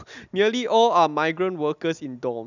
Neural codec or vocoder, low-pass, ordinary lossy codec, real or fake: none; 7.2 kHz; none; real